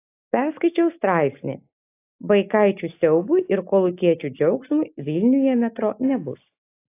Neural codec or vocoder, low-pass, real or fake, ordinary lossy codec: none; 3.6 kHz; real; AAC, 24 kbps